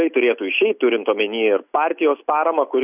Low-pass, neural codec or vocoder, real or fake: 3.6 kHz; none; real